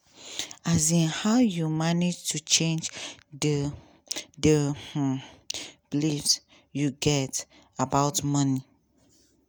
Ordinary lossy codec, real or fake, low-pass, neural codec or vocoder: none; real; none; none